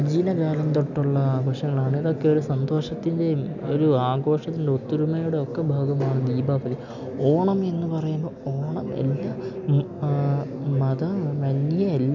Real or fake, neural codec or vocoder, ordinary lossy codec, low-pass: real; none; none; 7.2 kHz